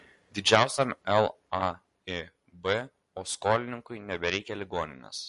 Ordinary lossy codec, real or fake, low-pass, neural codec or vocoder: MP3, 48 kbps; real; 14.4 kHz; none